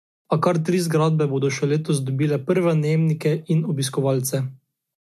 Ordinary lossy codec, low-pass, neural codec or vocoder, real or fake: MP3, 64 kbps; 14.4 kHz; none; real